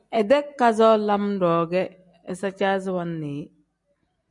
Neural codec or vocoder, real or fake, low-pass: none; real; 10.8 kHz